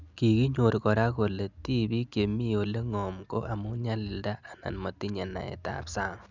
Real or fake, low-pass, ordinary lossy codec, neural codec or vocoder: real; 7.2 kHz; none; none